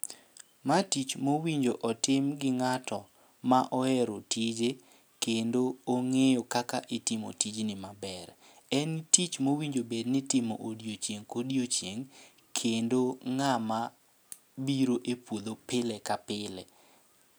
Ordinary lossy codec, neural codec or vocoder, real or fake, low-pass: none; none; real; none